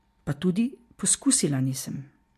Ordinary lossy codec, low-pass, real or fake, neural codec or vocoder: MP3, 64 kbps; 14.4 kHz; real; none